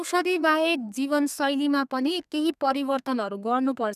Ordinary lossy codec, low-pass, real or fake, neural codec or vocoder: none; 14.4 kHz; fake; codec, 32 kHz, 1.9 kbps, SNAC